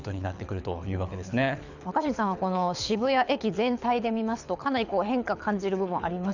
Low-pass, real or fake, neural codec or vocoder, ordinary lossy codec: 7.2 kHz; fake; codec, 24 kHz, 6 kbps, HILCodec; none